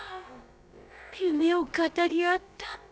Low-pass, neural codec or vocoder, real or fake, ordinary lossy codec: none; codec, 16 kHz, about 1 kbps, DyCAST, with the encoder's durations; fake; none